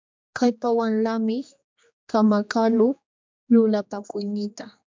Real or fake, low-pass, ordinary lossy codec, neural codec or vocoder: fake; 7.2 kHz; MP3, 64 kbps; codec, 16 kHz, 2 kbps, X-Codec, HuBERT features, trained on general audio